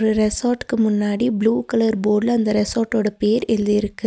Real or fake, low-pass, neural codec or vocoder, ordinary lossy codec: real; none; none; none